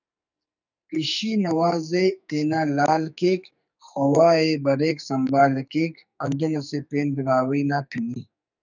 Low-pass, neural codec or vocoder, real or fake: 7.2 kHz; codec, 44.1 kHz, 2.6 kbps, SNAC; fake